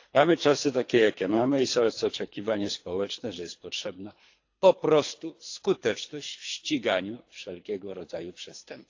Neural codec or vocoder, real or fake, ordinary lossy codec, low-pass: codec, 24 kHz, 3 kbps, HILCodec; fake; AAC, 48 kbps; 7.2 kHz